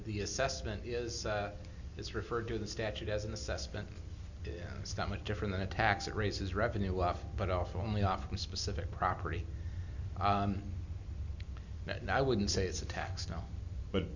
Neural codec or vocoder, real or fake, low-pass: none; real; 7.2 kHz